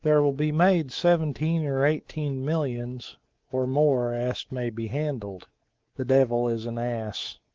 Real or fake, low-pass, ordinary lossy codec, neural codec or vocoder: fake; 7.2 kHz; Opus, 16 kbps; codec, 24 kHz, 3.1 kbps, DualCodec